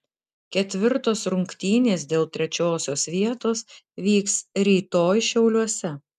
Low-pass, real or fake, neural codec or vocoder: 14.4 kHz; real; none